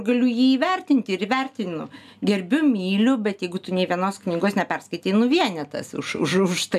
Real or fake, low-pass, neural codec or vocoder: real; 14.4 kHz; none